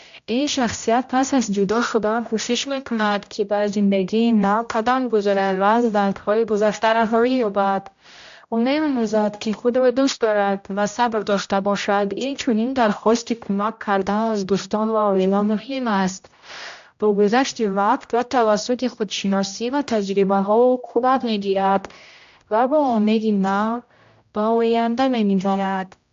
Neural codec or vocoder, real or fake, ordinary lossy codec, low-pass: codec, 16 kHz, 0.5 kbps, X-Codec, HuBERT features, trained on general audio; fake; AAC, 64 kbps; 7.2 kHz